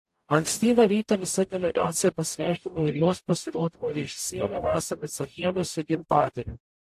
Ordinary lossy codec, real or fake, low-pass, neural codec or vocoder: AAC, 64 kbps; fake; 14.4 kHz; codec, 44.1 kHz, 0.9 kbps, DAC